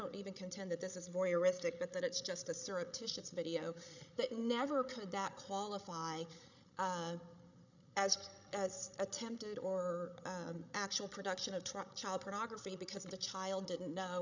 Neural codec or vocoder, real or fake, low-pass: codec, 16 kHz, 16 kbps, FreqCodec, larger model; fake; 7.2 kHz